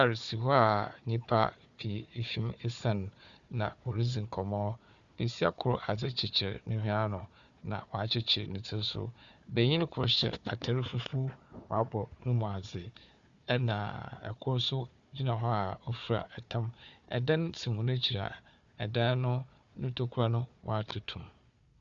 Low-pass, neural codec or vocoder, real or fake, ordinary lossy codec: 7.2 kHz; codec, 16 kHz, 4 kbps, FunCodec, trained on Chinese and English, 50 frames a second; fake; Opus, 64 kbps